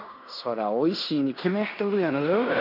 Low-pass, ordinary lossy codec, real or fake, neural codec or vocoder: 5.4 kHz; none; fake; codec, 16 kHz in and 24 kHz out, 0.9 kbps, LongCat-Audio-Codec, fine tuned four codebook decoder